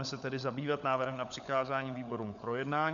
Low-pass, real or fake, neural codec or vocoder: 7.2 kHz; fake; codec, 16 kHz, 16 kbps, FunCodec, trained on LibriTTS, 50 frames a second